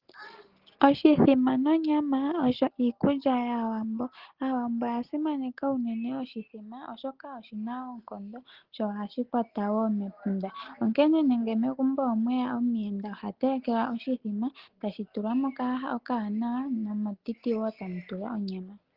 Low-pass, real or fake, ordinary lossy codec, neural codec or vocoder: 5.4 kHz; real; Opus, 16 kbps; none